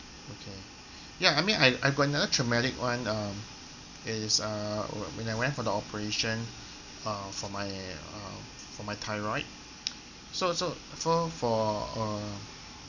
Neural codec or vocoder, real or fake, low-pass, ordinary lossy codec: none; real; 7.2 kHz; none